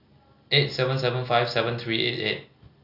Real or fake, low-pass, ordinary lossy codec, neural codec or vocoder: real; 5.4 kHz; Opus, 64 kbps; none